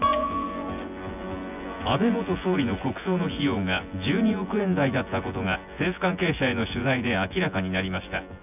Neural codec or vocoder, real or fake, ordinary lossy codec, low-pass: vocoder, 24 kHz, 100 mel bands, Vocos; fake; none; 3.6 kHz